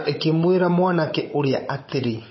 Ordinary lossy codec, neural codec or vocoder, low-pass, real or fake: MP3, 24 kbps; codec, 16 kHz, 16 kbps, FunCodec, trained on Chinese and English, 50 frames a second; 7.2 kHz; fake